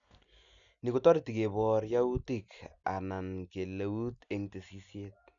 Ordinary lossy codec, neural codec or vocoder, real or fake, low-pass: none; none; real; 7.2 kHz